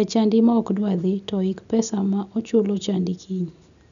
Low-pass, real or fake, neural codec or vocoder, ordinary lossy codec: 7.2 kHz; real; none; none